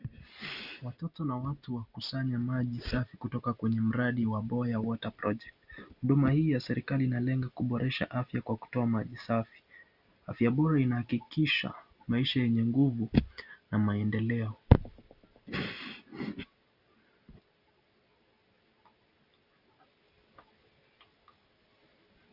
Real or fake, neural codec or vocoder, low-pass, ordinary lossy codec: real; none; 5.4 kHz; Opus, 64 kbps